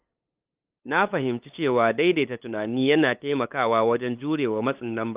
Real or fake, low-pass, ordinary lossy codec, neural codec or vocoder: fake; 3.6 kHz; Opus, 32 kbps; codec, 16 kHz, 8 kbps, FunCodec, trained on LibriTTS, 25 frames a second